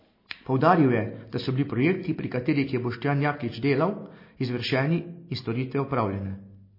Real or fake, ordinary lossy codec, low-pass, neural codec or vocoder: real; MP3, 24 kbps; 5.4 kHz; none